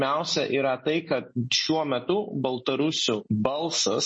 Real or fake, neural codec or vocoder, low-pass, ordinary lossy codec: real; none; 9.9 kHz; MP3, 32 kbps